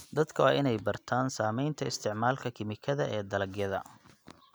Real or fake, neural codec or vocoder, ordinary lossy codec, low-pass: real; none; none; none